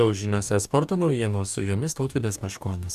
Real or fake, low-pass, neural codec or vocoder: fake; 14.4 kHz; codec, 44.1 kHz, 2.6 kbps, DAC